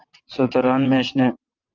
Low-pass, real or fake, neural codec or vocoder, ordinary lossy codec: 7.2 kHz; fake; vocoder, 22.05 kHz, 80 mel bands, WaveNeXt; Opus, 24 kbps